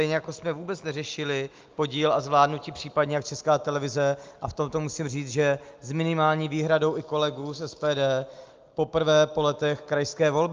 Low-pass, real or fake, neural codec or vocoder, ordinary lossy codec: 7.2 kHz; real; none; Opus, 24 kbps